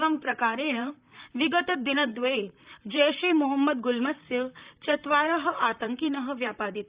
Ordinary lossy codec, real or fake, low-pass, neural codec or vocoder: Opus, 64 kbps; fake; 3.6 kHz; vocoder, 44.1 kHz, 128 mel bands, Pupu-Vocoder